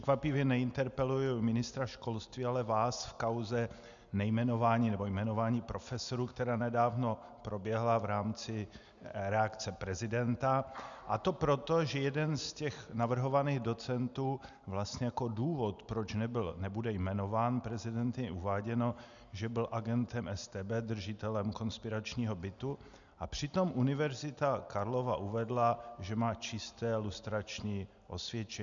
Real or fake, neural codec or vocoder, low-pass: real; none; 7.2 kHz